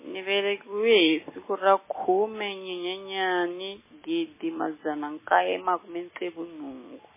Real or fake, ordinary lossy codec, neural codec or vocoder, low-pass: real; MP3, 16 kbps; none; 3.6 kHz